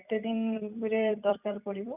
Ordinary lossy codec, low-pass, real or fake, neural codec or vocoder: none; 3.6 kHz; fake; vocoder, 44.1 kHz, 128 mel bands every 256 samples, BigVGAN v2